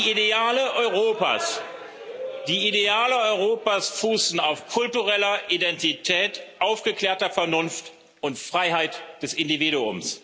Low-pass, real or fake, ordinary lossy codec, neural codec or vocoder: none; real; none; none